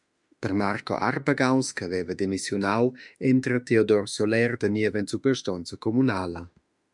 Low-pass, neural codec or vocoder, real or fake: 10.8 kHz; autoencoder, 48 kHz, 32 numbers a frame, DAC-VAE, trained on Japanese speech; fake